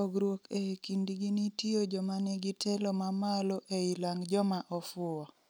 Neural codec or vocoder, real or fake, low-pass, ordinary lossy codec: none; real; none; none